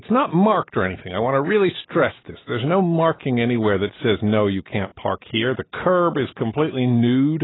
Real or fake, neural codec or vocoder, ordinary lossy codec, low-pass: real; none; AAC, 16 kbps; 7.2 kHz